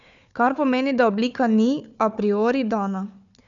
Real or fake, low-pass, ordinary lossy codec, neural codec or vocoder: fake; 7.2 kHz; none; codec, 16 kHz, 4 kbps, FunCodec, trained on Chinese and English, 50 frames a second